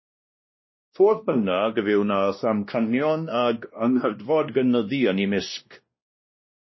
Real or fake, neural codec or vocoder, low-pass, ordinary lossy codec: fake; codec, 16 kHz, 1 kbps, X-Codec, WavLM features, trained on Multilingual LibriSpeech; 7.2 kHz; MP3, 24 kbps